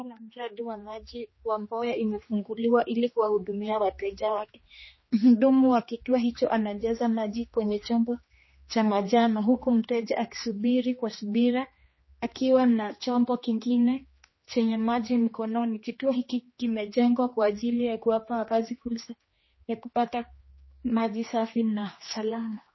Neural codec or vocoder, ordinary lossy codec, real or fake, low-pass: codec, 16 kHz, 2 kbps, X-Codec, HuBERT features, trained on general audio; MP3, 24 kbps; fake; 7.2 kHz